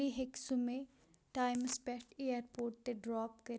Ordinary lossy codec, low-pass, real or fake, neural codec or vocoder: none; none; real; none